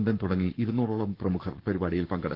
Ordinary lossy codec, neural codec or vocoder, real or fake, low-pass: Opus, 16 kbps; codec, 44.1 kHz, 7.8 kbps, Pupu-Codec; fake; 5.4 kHz